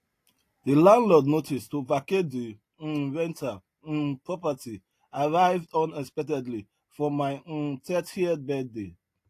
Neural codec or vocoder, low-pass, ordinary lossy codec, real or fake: none; 14.4 kHz; AAC, 48 kbps; real